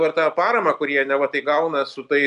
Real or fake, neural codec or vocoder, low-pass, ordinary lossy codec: real; none; 10.8 kHz; AAC, 96 kbps